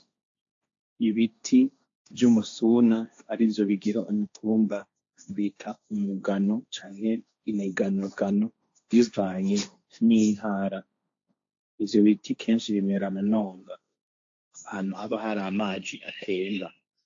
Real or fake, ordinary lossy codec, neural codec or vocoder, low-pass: fake; AAC, 48 kbps; codec, 16 kHz, 1.1 kbps, Voila-Tokenizer; 7.2 kHz